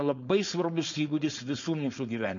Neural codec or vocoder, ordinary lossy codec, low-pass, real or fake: codec, 16 kHz, 4.8 kbps, FACodec; MP3, 96 kbps; 7.2 kHz; fake